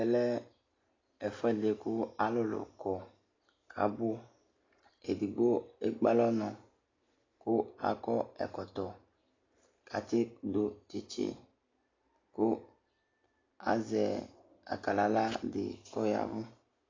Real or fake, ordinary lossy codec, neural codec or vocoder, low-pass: real; AAC, 32 kbps; none; 7.2 kHz